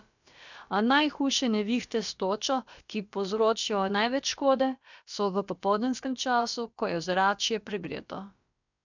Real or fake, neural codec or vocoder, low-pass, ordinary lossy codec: fake; codec, 16 kHz, about 1 kbps, DyCAST, with the encoder's durations; 7.2 kHz; none